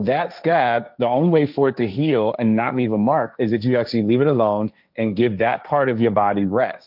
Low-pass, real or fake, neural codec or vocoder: 5.4 kHz; fake; codec, 16 kHz, 1.1 kbps, Voila-Tokenizer